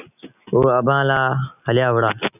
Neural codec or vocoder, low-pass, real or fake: none; 3.6 kHz; real